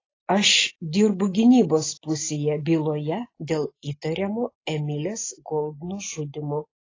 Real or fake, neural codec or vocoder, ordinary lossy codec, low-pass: real; none; AAC, 32 kbps; 7.2 kHz